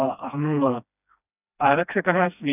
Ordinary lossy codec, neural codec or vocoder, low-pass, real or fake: none; codec, 16 kHz, 1 kbps, FreqCodec, smaller model; 3.6 kHz; fake